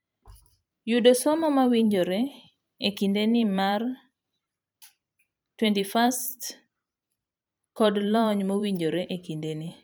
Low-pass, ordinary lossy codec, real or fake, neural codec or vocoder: none; none; fake; vocoder, 44.1 kHz, 128 mel bands every 512 samples, BigVGAN v2